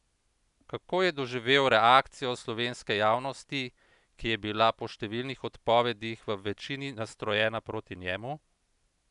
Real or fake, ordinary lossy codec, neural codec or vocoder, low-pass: real; none; none; 10.8 kHz